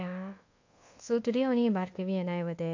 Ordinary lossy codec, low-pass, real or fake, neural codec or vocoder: AAC, 48 kbps; 7.2 kHz; fake; codec, 24 kHz, 1.2 kbps, DualCodec